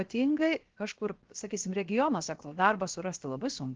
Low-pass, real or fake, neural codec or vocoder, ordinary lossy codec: 7.2 kHz; fake; codec, 16 kHz, 0.7 kbps, FocalCodec; Opus, 16 kbps